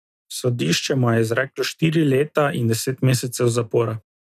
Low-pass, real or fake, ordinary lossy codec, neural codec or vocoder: 14.4 kHz; real; none; none